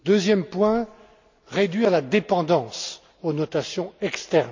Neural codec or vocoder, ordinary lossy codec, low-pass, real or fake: none; MP3, 64 kbps; 7.2 kHz; real